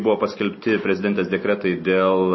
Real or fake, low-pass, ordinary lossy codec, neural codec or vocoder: real; 7.2 kHz; MP3, 24 kbps; none